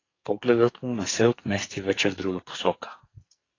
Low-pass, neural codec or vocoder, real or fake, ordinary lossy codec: 7.2 kHz; codec, 44.1 kHz, 2.6 kbps, SNAC; fake; AAC, 32 kbps